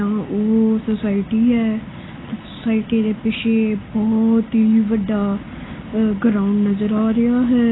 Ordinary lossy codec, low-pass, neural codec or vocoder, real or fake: AAC, 16 kbps; 7.2 kHz; none; real